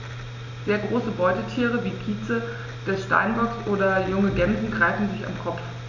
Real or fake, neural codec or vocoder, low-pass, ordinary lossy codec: real; none; 7.2 kHz; none